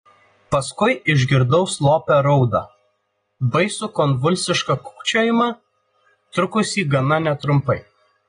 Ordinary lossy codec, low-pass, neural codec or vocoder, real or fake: AAC, 32 kbps; 9.9 kHz; none; real